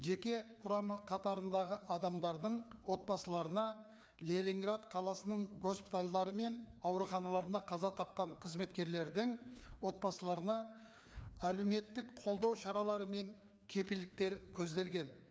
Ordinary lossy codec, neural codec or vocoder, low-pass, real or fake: none; codec, 16 kHz, 2 kbps, FreqCodec, larger model; none; fake